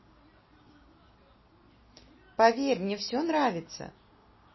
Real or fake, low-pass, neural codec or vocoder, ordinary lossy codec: real; 7.2 kHz; none; MP3, 24 kbps